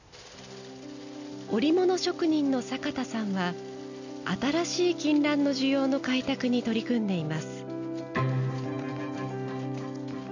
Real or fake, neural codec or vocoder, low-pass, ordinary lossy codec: real; none; 7.2 kHz; none